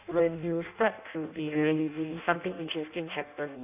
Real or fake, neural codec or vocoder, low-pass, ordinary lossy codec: fake; codec, 16 kHz in and 24 kHz out, 0.6 kbps, FireRedTTS-2 codec; 3.6 kHz; none